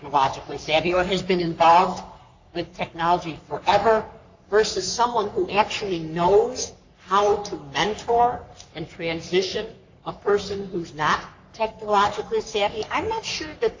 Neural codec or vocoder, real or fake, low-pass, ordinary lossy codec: codec, 44.1 kHz, 3.4 kbps, Pupu-Codec; fake; 7.2 kHz; AAC, 48 kbps